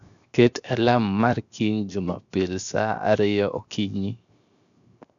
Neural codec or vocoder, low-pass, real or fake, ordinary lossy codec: codec, 16 kHz, 0.7 kbps, FocalCodec; 7.2 kHz; fake; none